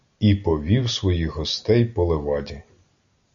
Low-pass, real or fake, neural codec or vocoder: 7.2 kHz; real; none